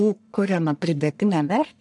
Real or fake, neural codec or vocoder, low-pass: fake; codec, 44.1 kHz, 1.7 kbps, Pupu-Codec; 10.8 kHz